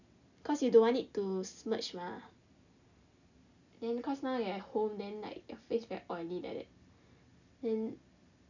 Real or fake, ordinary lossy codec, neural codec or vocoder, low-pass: real; none; none; 7.2 kHz